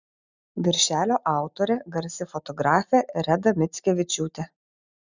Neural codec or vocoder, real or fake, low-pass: none; real; 7.2 kHz